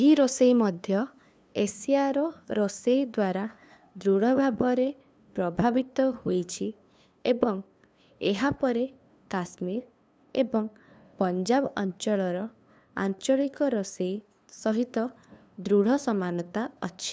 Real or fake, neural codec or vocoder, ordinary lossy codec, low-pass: fake; codec, 16 kHz, 2 kbps, FunCodec, trained on LibriTTS, 25 frames a second; none; none